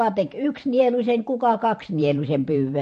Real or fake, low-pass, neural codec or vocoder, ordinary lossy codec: fake; 14.4 kHz; vocoder, 44.1 kHz, 128 mel bands every 512 samples, BigVGAN v2; MP3, 48 kbps